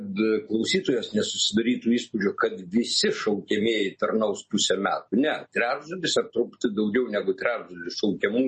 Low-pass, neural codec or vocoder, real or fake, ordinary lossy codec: 10.8 kHz; none; real; MP3, 32 kbps